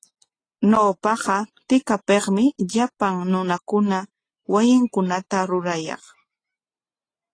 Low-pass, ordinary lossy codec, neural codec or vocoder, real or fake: 9.9 kHz; AAC, 32 kbps; none; real